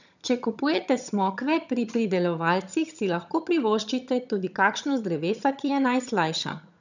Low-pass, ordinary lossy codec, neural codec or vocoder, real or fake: 7.2 kHz; none; vocoder, 22.05 kHz, 80 mel bands, HiFi-GAN; fake